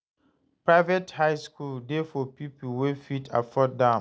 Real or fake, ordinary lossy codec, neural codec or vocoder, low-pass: real; none; none; none